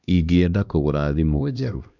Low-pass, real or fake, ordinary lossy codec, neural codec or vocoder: 7.2 kHz; fake; none; codec, 16 kHz, 1 kbps, X-Codec, HuBERT features, trained on LibriSpeech